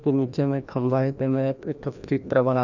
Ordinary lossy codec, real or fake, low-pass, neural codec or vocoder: none; fake; 7.2 kHz; codec, 16 kHz, 1 kbps, FreqCodec, larger model